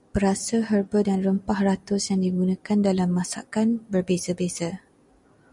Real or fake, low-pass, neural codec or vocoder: real; 10.8 kHz; none